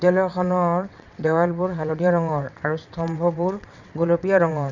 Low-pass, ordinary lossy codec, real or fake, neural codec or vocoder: 7.2 kHz; none; fake; vocoder, 22.05 kHz, 80 mel bands, WaveNeXt